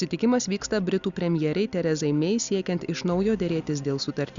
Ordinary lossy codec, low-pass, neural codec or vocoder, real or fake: Opus, 64 kbps; 7.2 kHz; none; real